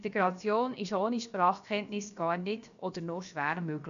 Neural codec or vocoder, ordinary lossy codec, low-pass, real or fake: codec, 16 kHz, about 1 kbps, DyCAST, with the encoder's durations; none; 7.2 kHz; fake